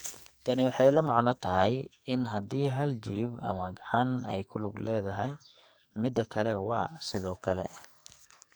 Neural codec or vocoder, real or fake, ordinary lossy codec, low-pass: codec, 44.1 kHz, 2.6 kbps, SNAC; fake; none; none